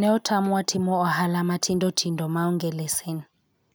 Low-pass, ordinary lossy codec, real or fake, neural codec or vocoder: none; none; real; none